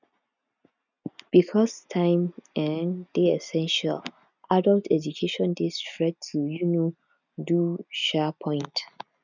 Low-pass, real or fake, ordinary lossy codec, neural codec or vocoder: 7.2 kHz; real; none; none